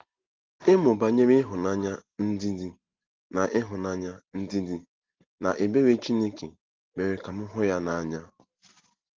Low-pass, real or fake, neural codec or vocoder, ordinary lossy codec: 7.2 kHz; real; none; Opus, 16 kbps